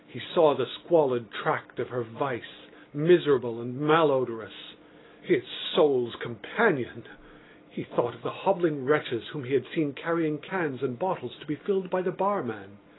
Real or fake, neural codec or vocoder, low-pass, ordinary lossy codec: real; none; 7.2 kHz; AAC, 16 kbps